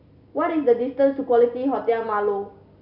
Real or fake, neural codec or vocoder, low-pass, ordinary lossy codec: real; none; 5.4 kHz; none